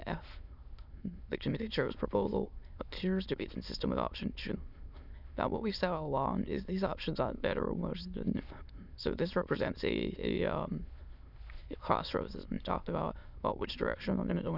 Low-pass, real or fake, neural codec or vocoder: 5.4 kHz; fake; autoencoder, 22.05 kHz, a latent of 192 numbers a frame, VITS, trained on many speakers